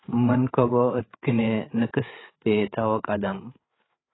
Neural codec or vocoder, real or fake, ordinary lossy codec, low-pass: codec, 16 kHz, 8 kbps, FreqCodec, larger model; fake; AAC, 16 kbps; 7.2 kHz